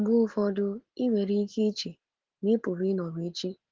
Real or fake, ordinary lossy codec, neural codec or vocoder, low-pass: real; Opus, 16 kbps; none; 7.2 kHz